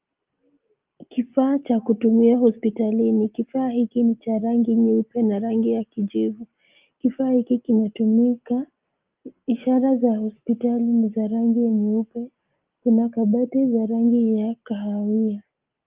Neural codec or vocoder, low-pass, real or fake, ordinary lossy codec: none; 3.6 kHz; real; Opus, 32 kbps